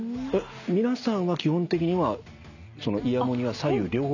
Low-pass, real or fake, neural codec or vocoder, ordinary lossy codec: 7.2 kHz; real; none; none